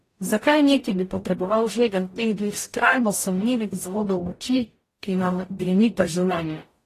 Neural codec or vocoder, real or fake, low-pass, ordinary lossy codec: codec, 44.1 kHz, 0.9 kbps, DAC; fake; 14.4 kHz; AAC, 48 kbps